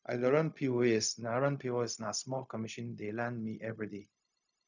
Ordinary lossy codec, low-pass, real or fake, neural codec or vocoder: none; 7.2 kHz; fake; codec, 16 kHz, 0.4 kbps, LongCat-Audio-Codec